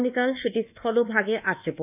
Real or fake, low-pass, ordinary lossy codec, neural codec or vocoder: fake; 3.6 kHz; none; codec, 24 kHz, 1.2 kbps, DualCodec